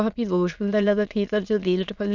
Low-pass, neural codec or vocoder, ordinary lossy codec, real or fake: 7.2 kHz; autoencoder, 22.05 kHz, a latent of 192 numbers a frame, VITS, trained on many speakers; none; fake